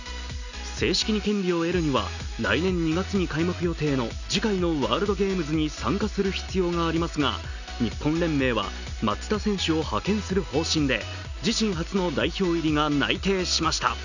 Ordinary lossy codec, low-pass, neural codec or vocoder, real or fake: none; 7.2 kHz; none; real